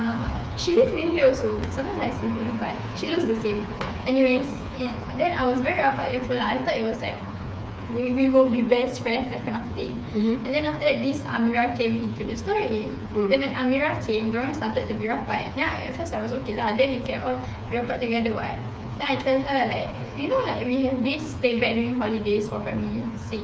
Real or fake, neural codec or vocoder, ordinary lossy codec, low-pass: fake; codec, 16 kHz, 4 kbps, FreqCodec, smaller model; none; none